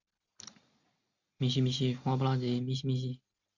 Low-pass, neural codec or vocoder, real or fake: 7.2 kHz; none; real